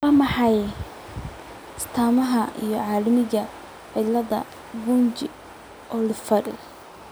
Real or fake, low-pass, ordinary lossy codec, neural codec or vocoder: real; none; none; none